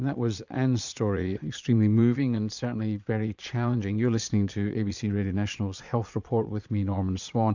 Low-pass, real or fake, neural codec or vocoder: 7.2 kHz; fake; vocoder, 22.05 kHz, 80 mel bands, Vocos